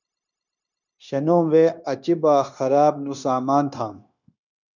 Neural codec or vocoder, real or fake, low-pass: codec, 16 kHz, 0.9 kbps, LongCat-Audio-Codec; fake; 7.2 kHz